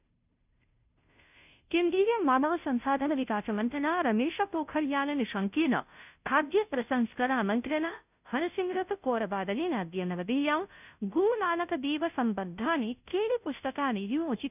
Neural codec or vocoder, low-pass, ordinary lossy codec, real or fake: codec, 16 kHz, 0.5 kbps, FunCodec, trained on Chinese and English, 25 frames a second; 3.6 kHz; none; fake